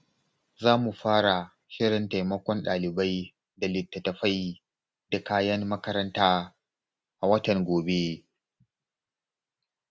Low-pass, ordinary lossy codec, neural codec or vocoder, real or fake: none; none; none; real